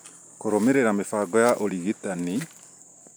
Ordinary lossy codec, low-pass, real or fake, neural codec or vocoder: none; none; real; none